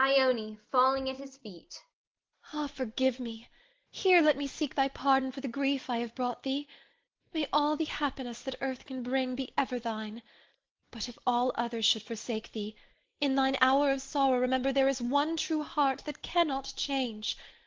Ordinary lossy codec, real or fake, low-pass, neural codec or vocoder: Opus, 16 kbps; real; 7.2 kHz; none